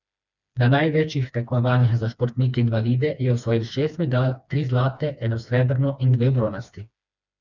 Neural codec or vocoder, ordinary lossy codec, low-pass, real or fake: codec, 16 kHz, 2 kbps, FreqCodec, smaller model; none; 7.2 kHz; fake